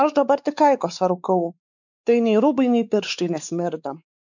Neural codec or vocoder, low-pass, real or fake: codec, 16 kHz, 4 kbps, X-Codec, WavLM features, trained on Multilingual LibriSpeech; 7.2 kHz; fake